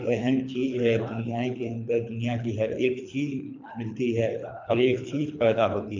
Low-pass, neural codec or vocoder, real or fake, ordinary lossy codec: 7.2 kHz; codec, 24 kHz, 3 kbps, HILCodec; fake; MP3, 64 kbps